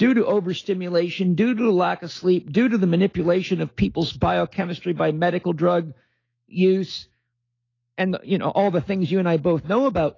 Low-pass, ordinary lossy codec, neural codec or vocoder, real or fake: 7.2 kHz; AAC, 32 kbps; autoencoder, 48 kHz, 128 numbers a frame, DAC-VAE, trained on Japanese speech; fake